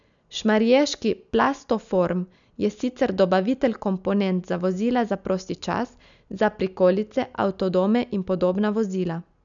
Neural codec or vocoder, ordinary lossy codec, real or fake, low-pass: none; none; real; 7.2 kHz